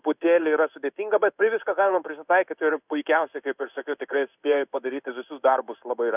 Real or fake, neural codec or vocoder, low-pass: fake; codec, 16 kHz in and 24 kHz out, 1 kbps, XY-Tokenizer; 3.6 kHz